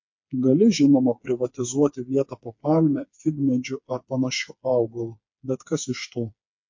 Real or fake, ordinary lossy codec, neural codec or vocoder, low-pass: fake; MP3, 48 kbps; codec, 16 kHz, 4 kbps, FreqCodec, smaller model; 7.2 kHz